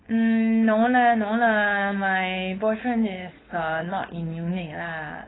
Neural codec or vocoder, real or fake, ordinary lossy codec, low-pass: codec, 16 kHz, 4.8 kbps, FACodec; fake; AAC, 16 kbps; 7.2 kHz